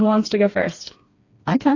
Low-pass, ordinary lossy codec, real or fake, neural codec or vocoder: 7.2 kHz; AAC, 32 kbps; fake; codec, 16 kHz, 2 kbps, FreqCodec, smaller model